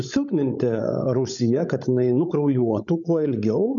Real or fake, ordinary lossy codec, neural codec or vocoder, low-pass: fake; MP3, 64 kbps; codec, 16 kHz, 16 kbps, FreqCodec, larger model; 7.2 kHz